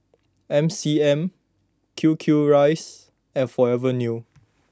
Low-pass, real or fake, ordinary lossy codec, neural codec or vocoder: none; real; none; none